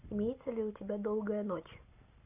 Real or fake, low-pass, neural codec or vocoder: real; 3.6 kHz; none